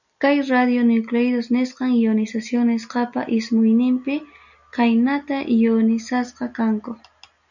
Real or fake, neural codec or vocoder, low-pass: real; none; 7.2 kHz